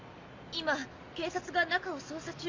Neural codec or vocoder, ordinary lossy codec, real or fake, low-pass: none; MP3, 48 kbps; real; 7.2 kHz